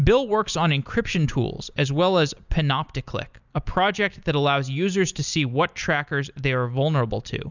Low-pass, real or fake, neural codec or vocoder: 7.2 kHz; real; none